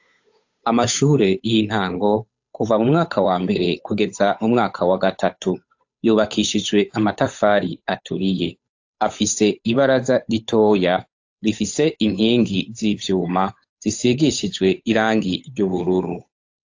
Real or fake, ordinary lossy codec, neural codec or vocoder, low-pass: fake; AAC, 48 kbps; codec, 16 kHz, 8 kbps, FunCodec, trained on Chinese and English, 25 frames a second; 7.2 kHz